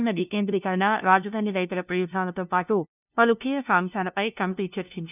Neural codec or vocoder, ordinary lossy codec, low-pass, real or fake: codec, 16 kHz, 0.5 kbps, FunCodec, trained on LibriTTS, 25 frames a second; none; 3.6 kHz; fake